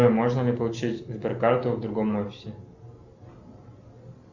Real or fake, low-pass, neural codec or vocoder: real; 7.2 kHz; none